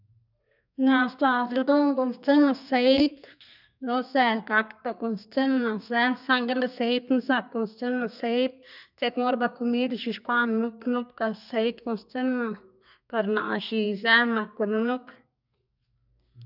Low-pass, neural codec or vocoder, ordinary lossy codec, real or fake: 5.4 kHz; codec, 32 kHz, 1.9 kbps, SNAC; none; fake